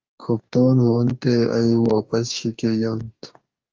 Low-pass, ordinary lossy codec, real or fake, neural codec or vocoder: 7.2 kHz; Opus, 32 kbps; fake; codec, 44.1 kHz, 2.6 kbps, DAC